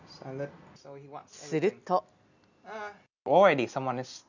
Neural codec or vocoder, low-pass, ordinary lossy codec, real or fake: none; 7.2 kHz; none; real